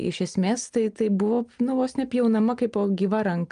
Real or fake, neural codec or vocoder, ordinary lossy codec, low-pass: real; none; Opus, 32 kbps; 9.9 kHz